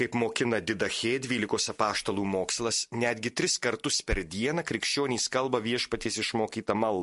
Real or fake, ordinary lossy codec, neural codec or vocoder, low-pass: real; MP3, 48 kbps; none; 14.4 kHz